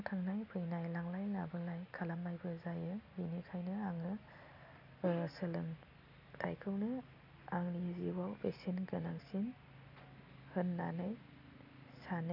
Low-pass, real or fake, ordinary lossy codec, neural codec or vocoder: 5.4 kHz; real; none; none